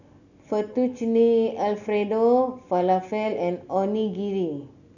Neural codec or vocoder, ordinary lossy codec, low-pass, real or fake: none; none; 7.2 kHz; real